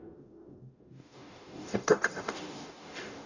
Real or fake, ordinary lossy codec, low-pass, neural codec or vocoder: fake; none; 7.2 kHz; codec, 44.1 kHz, 0.9 kbps, DAC